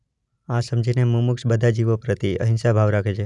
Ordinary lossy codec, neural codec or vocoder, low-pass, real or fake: none; none; 10.8 kHz; real